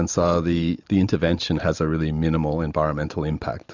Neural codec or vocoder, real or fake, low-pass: none; real; 7.2 kHz